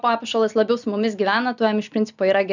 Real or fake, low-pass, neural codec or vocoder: real; 7.2 kHz; none